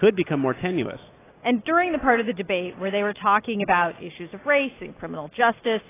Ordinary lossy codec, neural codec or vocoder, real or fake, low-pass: AAC, 16 kbps; none; real; 3.6 kHz